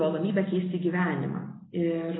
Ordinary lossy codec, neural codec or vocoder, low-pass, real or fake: AAC, 16 kbps; none; 7.2 kHz; real